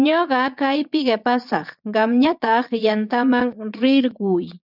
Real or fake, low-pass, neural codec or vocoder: fake; 5.4 kHz; vocoder, 22.05 kHz, 80 mel bands, Vocos